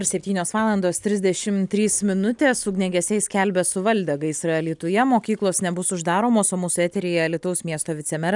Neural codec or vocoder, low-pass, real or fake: none; 10.8 kHz; real